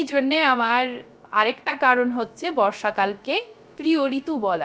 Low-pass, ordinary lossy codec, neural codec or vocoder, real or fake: none; none; codec, 16 kHz, 0.3 kbps, FocalCodec; fake